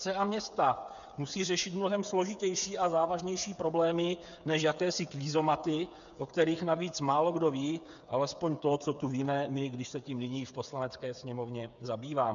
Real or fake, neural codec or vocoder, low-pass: fake; codec, 16 kHz, 8 kbps, FreqCodec, smaller model; 7.2 kHz